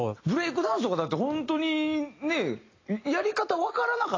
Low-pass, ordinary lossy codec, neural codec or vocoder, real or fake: 7.2 kHz; AAC, 32 kbps; none; real